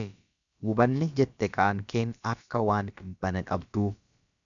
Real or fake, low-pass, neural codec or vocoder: fake; 7.2 kHz; codec, 16 kHz, about 1 kbps, DyCAST, with the encoder's durations